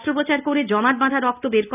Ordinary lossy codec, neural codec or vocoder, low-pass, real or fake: none; none; 3.6 kHz; real